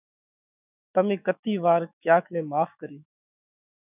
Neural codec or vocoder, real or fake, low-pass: autoencoder, 48 kHz, 128 numbers a frame, DAC-VAE, trained on Japanese speech; fake; 3.6 kHz